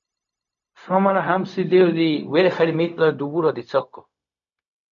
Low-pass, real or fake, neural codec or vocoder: 7.2 kHz; fake; codec, 16 kHz, 0.4 kbps, LongCat-Audio-Codec